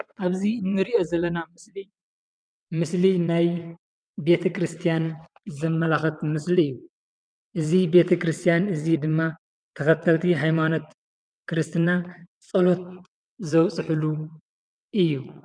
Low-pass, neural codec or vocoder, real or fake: 9.9 kHz; vocoder, 22.05 kHz, 80 mel bands, WaveNeXt; fake